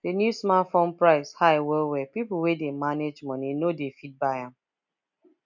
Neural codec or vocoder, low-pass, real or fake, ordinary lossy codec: none; 7.2 kHz; real; none